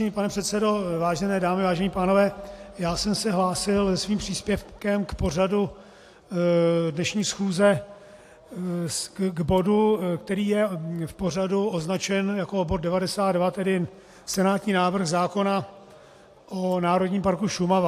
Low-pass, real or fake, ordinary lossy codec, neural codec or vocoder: 14.4 kHz; real; AAC, 64 kbps; none